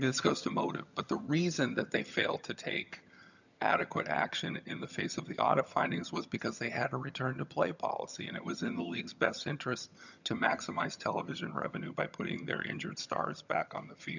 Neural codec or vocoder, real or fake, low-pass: vocoder, 22.05 kHz, 80 mel bands, HiFi-GAN; fake; 7.2 kHz